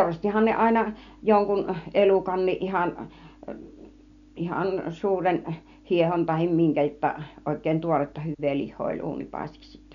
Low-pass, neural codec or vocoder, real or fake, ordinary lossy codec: 7.2 kHz; none; real; none